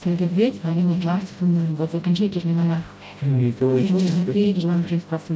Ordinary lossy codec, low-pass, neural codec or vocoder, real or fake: none; none; codec, 16 kHz, 0.5 kbps, FreqCodec, smaller model; fake